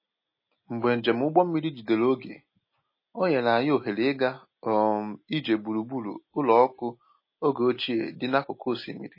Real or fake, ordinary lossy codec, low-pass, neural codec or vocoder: real; MP3, 24 kbps; 5.4 kHz; none